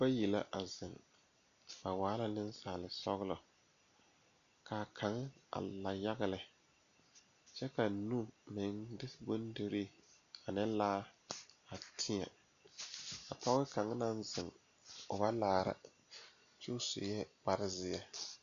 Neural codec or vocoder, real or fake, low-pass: none; real; 7.2 kHz